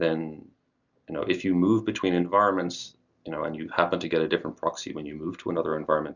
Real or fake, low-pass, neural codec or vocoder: real; 7.2 kHz; none